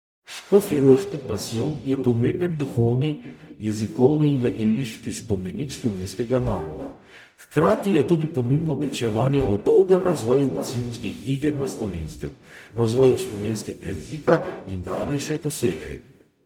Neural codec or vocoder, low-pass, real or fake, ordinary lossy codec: codec, 44.1 kHz, 0.9 kbps, DAC; 19.8 kHz; fake; none